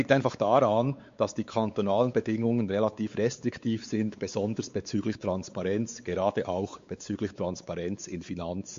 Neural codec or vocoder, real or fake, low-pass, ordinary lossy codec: codec, 16 kHz, 4 kbps, X-Codec, WavLM features, trained on Multilingual LibriSpeech; fake; 7.2 kHz; MP3, 48 kbps